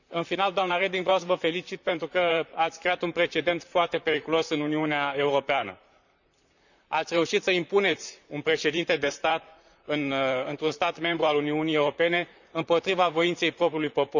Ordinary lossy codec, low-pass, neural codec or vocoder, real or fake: none; 7.2 kHz; vocoder, 44.1 kHz, 128 mel bands, Pupu-Vocoder; fake